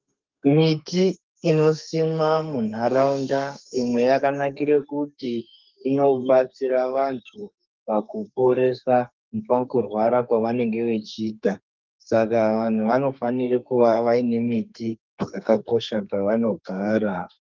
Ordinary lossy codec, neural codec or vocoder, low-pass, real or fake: Opus, 32 kbps; codec, 32 kHz, 1.9 kbps, SNAC; 7.2 kHz; fake